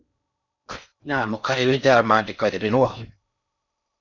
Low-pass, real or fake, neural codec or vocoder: 7.2 kHz; fake; codec, 16 kHz in and 24 kHz out, 0.6 kbps, FocalCodec, streaming, 4096 codes